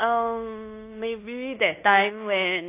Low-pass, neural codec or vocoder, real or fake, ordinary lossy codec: 3.6 kHz; codec, 16 kHz in and 24 kHz out, 0.9 kbps, LongCat-Audio-Codec, fine tuned four codebook decoder; fake; AAC, 24 kbps